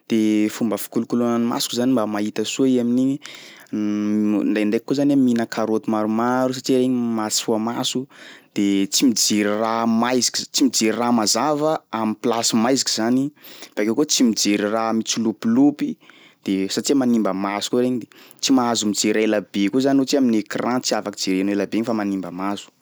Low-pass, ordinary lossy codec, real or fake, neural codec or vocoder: none; none; real; none